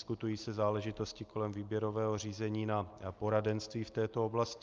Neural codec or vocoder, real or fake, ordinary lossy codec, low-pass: none; real; Opus, 24 kbps; 7.2 kHz